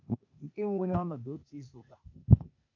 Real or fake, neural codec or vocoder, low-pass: fake; codec, 16 kHz, 0.8 kbps, ZipCodec; 7.2 kHz